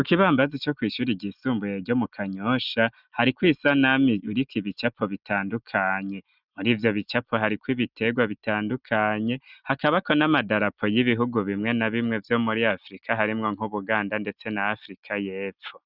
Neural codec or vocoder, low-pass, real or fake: none; 5.4 kHz; real